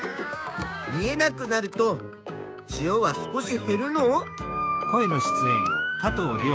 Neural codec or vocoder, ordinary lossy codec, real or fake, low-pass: codec, 16 kHz, 6 kbps, DAC; none; fake; none